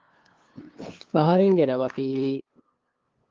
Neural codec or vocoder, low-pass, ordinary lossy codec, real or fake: codec, 16 kHz, 2 kbps, FunCodec, trained on LibriTTS, 25 frames a second; 7.2 kHz; Opus, 32 kbps; fake